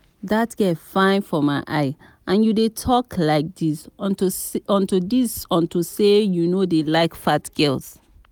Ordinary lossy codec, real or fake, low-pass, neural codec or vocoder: none; real; none; none